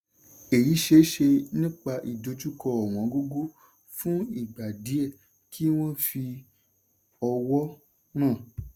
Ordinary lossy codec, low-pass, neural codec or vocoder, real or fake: none; 19.8 kHz; none; real